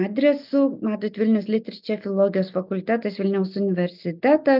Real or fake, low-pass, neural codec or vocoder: real; 5.4 kHz; none